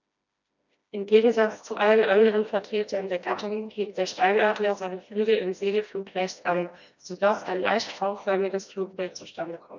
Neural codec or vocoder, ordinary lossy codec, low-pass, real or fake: codec, 16 kHz, 1 kbps, FreqCodec, smaller model; none; 7.2 kHz; fake